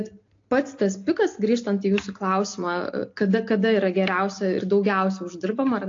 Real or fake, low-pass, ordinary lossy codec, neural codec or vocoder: real; 7.2 kHz; AAC, 48 kbps; none